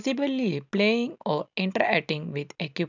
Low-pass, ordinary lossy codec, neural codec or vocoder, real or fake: 7.2 kHz; none; none; real